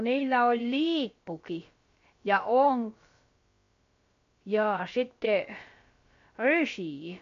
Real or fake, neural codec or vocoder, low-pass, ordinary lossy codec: fake; codec, 16 kHz, about 1 kbps, DyCAST, with the encoder's durations; 7.2 kHz; MP3, 48 kbps